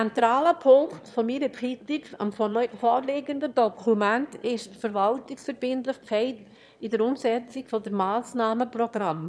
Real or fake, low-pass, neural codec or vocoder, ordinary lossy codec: fake; none; autoencoder, 22.05 kHz, a latent of 192 numbers a frame, VITS, trained on one speaker; none